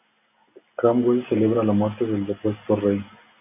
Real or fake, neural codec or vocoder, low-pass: real; none; 3.6 kHz